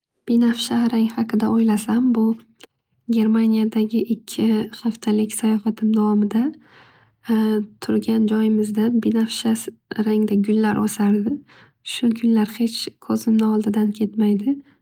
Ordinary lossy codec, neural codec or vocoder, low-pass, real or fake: Opus, 32 kbps; none; 19.8 kHz; real